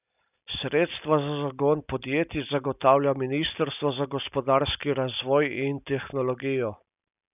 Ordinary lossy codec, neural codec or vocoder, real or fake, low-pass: none; none; real; 3.6 kHz